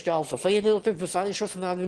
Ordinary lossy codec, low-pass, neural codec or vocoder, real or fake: Opus, 16 kbps; 9.9 kHz; autoencoder, 22.05 kHz, a latent of 192 numbers a frame, VITS, trained on one speaker; fake